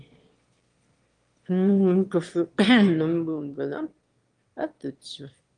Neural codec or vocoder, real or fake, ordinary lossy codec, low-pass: autoencoder, 22.05 kHz, a latent of 192 numbers a frame, VITS, trained on one speaker; fake; Opus, 24 kbps; 9.9 kHz